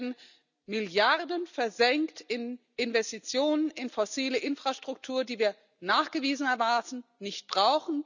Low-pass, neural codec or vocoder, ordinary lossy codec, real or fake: 7.2 kHz; none; none; real